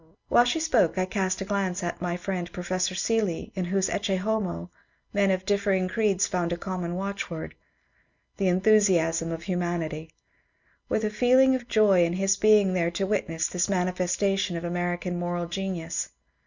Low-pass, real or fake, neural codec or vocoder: 7.2 kHz; real; none